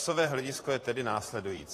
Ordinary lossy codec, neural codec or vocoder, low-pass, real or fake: AAC, 48 kbps; vocoder, 44.1 kHz, 128 mel bands, Pupu-Vocoder; 14.4 kHz; fake